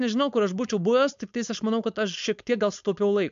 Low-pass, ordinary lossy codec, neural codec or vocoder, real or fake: 7.2 kHz; MP3, 64 kbps; codec, 16 kHz, 4.8 kbps, FACodec; fake